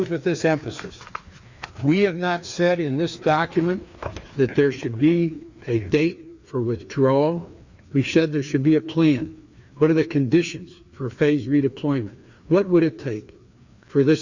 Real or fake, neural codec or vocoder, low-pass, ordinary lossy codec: fake; codec, 16 kHz, 2 kbps, FreqCodec, larger model; 7.2 kHz; Opus, 64 kbps